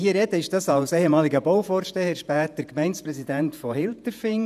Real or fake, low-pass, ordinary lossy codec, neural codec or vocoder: fake; 14.4 kHz; none; vocoder, 48 kHz, 128 mel bands, Vocos